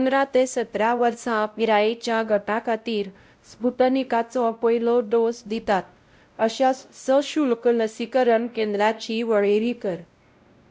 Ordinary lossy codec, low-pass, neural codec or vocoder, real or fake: none; none; codec, 16 kHz, 0.5 kbps, X-Codec, WavLM features, trained on Multilingual LibriSpeech; fake